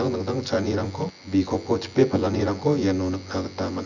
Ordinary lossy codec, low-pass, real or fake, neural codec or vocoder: none; 7.2 kHz; fake; vocoder, 24 kHz, 100 mel bands, Vocos